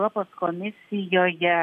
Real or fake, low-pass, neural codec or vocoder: real; 14.4 kHz; none